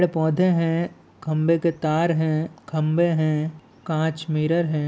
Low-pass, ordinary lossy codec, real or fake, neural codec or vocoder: none; none; real; none